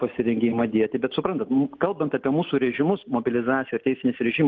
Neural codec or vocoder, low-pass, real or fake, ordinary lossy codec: none; 7.2 kHz; real; Opus, 32 kbps